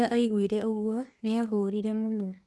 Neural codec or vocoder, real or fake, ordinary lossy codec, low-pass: codec, 24 kHz, 1 kbps, SNAC; fake; none; none